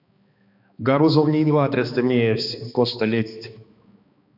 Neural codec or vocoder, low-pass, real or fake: codec, 16 kHz, 2 kbps, X-Codec, HuBERT features, trained on balanced general audio; 5.4 kHz; fake